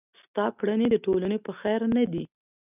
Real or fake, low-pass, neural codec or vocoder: real; 3.6 kHz; none